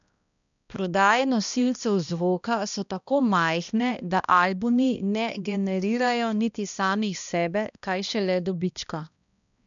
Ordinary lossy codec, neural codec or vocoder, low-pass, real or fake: none; codec, 16 kHz, 1 kbps, X-Codec, HuBERT features, trained on balanced general audio; 7.2 kHz; fake